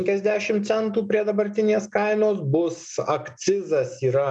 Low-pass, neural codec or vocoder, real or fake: 9.9 kHz; none; real